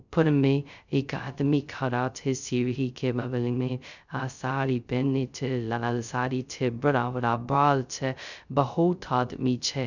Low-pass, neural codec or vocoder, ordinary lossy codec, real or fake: 7.2 kHz; codec, 16 kHz, 0.2 kbps, FocalCodec; none; fake